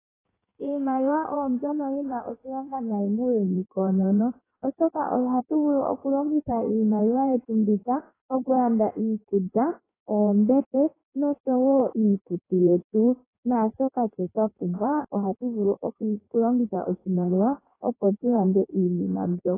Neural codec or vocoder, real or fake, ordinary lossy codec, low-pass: codec, 16 kHz in and 24 kHz out, 1.1 kbps, FireRedTTS-2 codec; fake; AAC, 16 kbps; 3.6 kHz